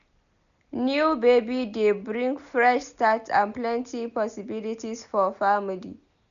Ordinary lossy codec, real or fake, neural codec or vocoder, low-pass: none; real; none; 7.2 kHz